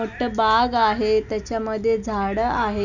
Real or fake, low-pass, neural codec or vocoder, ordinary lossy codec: real; 7.2 kHz; none; none